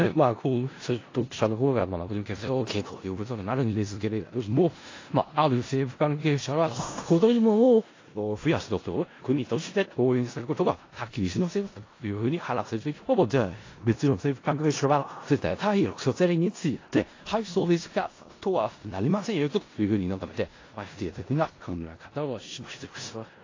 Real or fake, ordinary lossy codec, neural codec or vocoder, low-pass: fake; AAC, 32 kbps; codec, 16 kHz in and 24 kHz out, 0.4 kbps, LongCat-Audio-Codec, four codebook decoder; 7.2 kHz